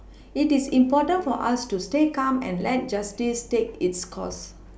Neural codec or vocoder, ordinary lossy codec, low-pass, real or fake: none; none; none; real